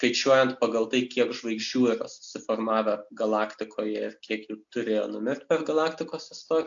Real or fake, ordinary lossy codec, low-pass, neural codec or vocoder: real; AAC, 64 kbps; 7.2 kHz; none